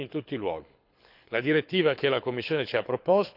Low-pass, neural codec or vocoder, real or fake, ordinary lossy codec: 5.4 kHz; codec, 24 kHz, 6 kbps, HILCodec; fake; none